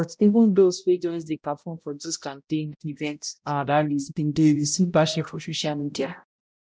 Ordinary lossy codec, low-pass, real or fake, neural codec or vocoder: none; none; fake; codec, 16 kHz, 0.5 kbps, X-Codec, HuBERT features, trained on balanced general audio